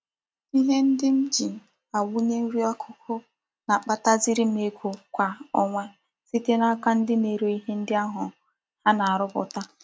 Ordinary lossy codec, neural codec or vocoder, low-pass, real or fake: none; none; none; real